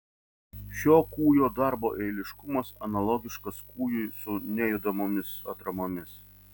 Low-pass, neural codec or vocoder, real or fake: 19.8 kHz; none; real